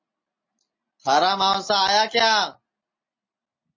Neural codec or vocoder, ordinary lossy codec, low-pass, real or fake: none; MP3, 32 kbps; 7.2 kHz; real